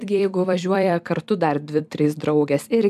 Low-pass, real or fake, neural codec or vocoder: 14.4 kHz; fake; vocoder, 44.1 kHz, 128 mel bands every 256 samples, BigVGAN v2